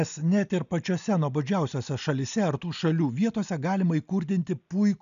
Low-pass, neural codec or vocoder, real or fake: 7.2 kHz; none; real